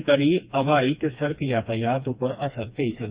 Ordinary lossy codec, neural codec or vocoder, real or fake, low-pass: Opus, 64 kbps; codec, 16 kHz, 2 kbps, FreqCodec, smaller model; fake; 3.6 kHz